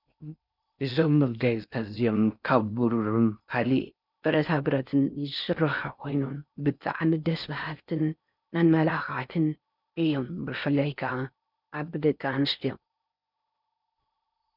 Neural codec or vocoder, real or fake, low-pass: codec, 16 kHz in and 24 kHz out, 0.6 kbps, FocalCodec, streaming, 4096 codes; fake; 5.4 kHz